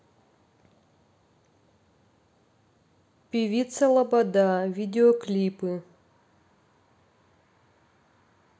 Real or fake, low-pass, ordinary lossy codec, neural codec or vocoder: real; none; none; none